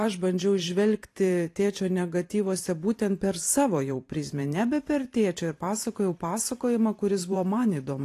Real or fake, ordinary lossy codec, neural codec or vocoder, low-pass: fake; AAC, 48 kbps; vocoder, 44.1 kHz, 128 mel bands every 512 samples, BigVGAN v2; 14.4 kHz